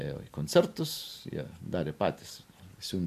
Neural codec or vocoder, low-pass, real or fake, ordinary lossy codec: none; 14.4 kHz; real; AAC, 96 kbps